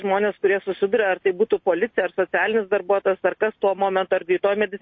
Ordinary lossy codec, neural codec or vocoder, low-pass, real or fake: MP3, 32 kbps; none; 7.2 kHz; real